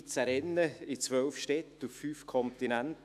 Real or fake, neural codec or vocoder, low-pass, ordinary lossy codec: fake; autoencoder, 48 kHz, 128 numbers a frame, DAC-VAE, trained on Japanese speech; 14.4 kHz; none